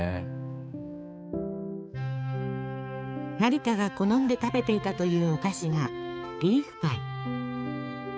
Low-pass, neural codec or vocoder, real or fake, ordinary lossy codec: none; codec, 16 kHz, 4 kbps, X-Codec, HuBERT features, trained on balanced general audio; fake; none